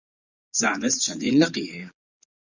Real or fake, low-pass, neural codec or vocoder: fake; 7.2 kHz; vocoder, 22.05 kHz, 80 mel bands, Vocos